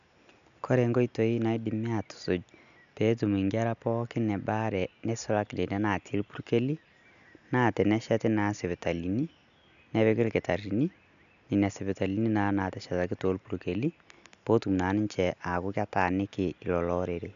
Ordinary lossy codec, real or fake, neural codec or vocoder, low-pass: none; real; none; 7.2 kHz